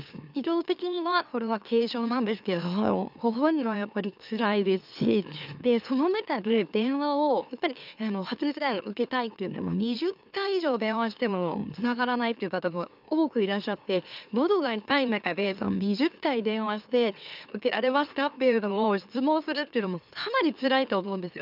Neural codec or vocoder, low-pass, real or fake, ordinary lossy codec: autoencoder, 44.1 kHz, a latent of 192 numbers a frame, MeloTTS; 5.4 kHz; fake; none